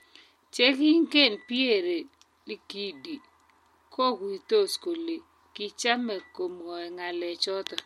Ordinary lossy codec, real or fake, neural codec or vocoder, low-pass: MP3, 64 kbps; real; none; 19.8 kHz